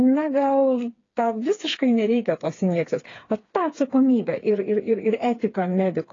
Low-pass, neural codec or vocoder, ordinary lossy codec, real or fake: 7.2 kHz; codec, 16 kHz, 4 kbps, FreqCodec, smaller model; AAC, 32 kbps; fake